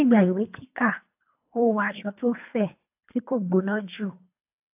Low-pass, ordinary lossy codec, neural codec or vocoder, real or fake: 3.6 kHz; AAC, 32 kbps; codec, 24 kHz, 3 kbps, HILCodec; fake